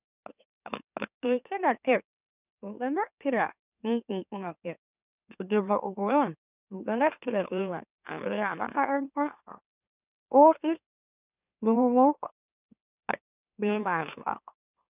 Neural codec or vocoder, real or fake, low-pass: autoencoder, 44.1 kHz, a latent of 192 numbers a frame, MeloTTS; fake; 3.6 kHz